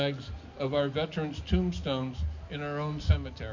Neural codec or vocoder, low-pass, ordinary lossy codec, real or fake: none; 7.2 kHz; MP3, 48 kbps; real